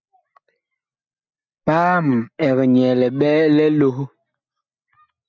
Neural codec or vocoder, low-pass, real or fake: none; 7.2 kHz; real